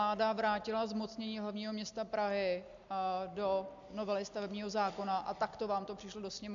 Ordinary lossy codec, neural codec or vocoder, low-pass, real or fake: AAC, 64 kbps; none; 7.2 kHz; real